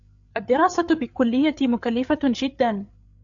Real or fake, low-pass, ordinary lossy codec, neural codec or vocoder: fake; 7.2 kHz; AAC, 64 kbps; codec, 16 kHz, 8 kbps, FreqCodec, larger model